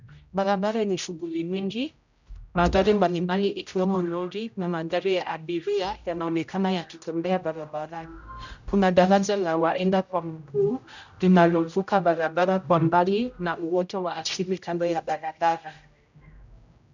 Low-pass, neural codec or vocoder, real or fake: 7.2 kHz; codec, 16 kHz, 0.5 kbps, X-Codec, HuBERT features, trained on general audio; fake